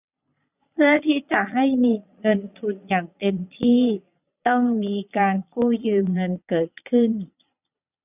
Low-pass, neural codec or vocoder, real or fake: 3.6 kHz; vocoder, 22.05 kHz, 80 mel bands, Vocos; fake